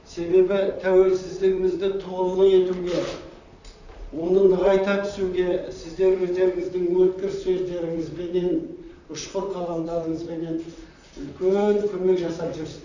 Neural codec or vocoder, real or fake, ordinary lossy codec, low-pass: vocoder, 44.1 kHz, 128 mel bands, Pupu-Vocoder; fake; none; 7.2 kHz